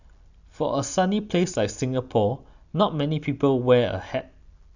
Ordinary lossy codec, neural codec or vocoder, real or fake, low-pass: none; none; real; 7.2 kHz